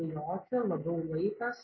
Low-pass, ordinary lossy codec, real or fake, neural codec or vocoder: 7.2 kHz; MP3, 24 kbps; real; none